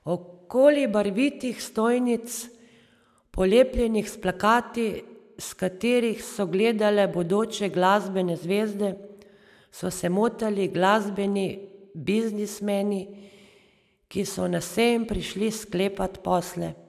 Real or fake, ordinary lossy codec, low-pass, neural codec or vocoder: real; none; 14.4 kHz; none